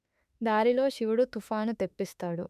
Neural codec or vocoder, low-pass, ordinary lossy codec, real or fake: autoencoder, 48 kHz, 32 numbers a frame, DAC-VAE, trained on Japanese speech; 14.4 kHz; none; fake